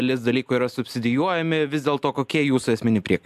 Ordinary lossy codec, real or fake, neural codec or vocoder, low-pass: MP3, 96 kbps; real; none; 14.4 kHz